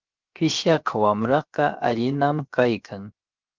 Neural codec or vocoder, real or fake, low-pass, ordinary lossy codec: codec, 16 kHz, 0.7 kbps, FocalCodec; fake; 7.2 kHz; Opus, 32 kbps